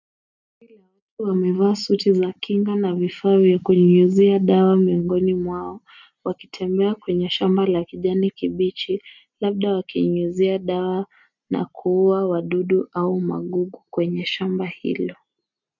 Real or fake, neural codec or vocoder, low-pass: real; none; 7.2 kHz